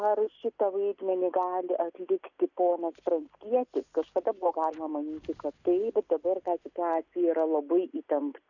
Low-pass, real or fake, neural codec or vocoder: 7.2 kHz; real; none